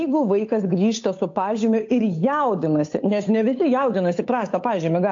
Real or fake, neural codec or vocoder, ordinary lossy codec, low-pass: fake; codec, 16 kHz, 8 kbps, FunCodec, trained on Chinese and English, 25 frames a second; AAC, 48 kbps; 7.2 kHz